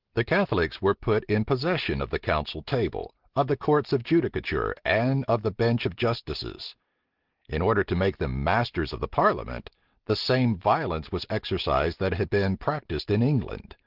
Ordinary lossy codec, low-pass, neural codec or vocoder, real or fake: Opus, 16 kbps; 5.4 kHz; none; real